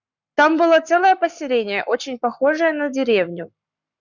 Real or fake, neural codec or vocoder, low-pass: fake; codec, 44.1 kHz, 7.8 kbps, Pupu-Codec; 7.2 kHz